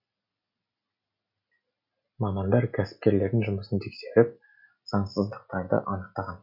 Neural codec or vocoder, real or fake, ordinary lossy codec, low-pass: none; real; MP3, 48 kbps; 5.4 kHz